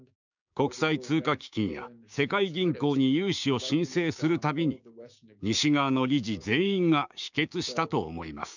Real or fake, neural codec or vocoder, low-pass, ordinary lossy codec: fake; codec, 16 kHz, 6 kbps, DAC; 7.2 kHz; none